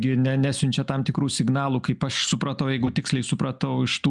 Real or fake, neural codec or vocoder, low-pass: real; none; 10.8 kHz